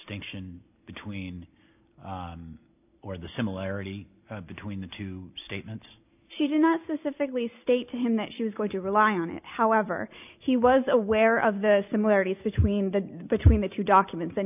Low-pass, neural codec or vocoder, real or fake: 3.6 kHz; none; real